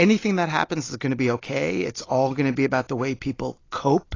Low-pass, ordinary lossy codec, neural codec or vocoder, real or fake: 7.2 kHz; AAC, 32 kbps; none; real